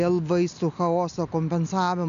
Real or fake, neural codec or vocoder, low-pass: real; none; 7.2 kHz